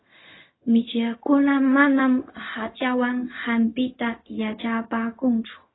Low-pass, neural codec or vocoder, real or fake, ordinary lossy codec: 7.2 kHz; codec, 16 kHz, 0.4 kbps, LongCat-Audio-Codec; fake; AAC, 16 kbps